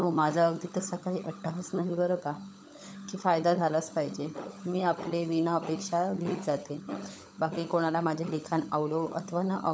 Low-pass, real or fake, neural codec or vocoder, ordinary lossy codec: none; fake; codec, 16 kHz, 16 kbps, FunCodec, trained on LibriTTS, 50 frames a second; none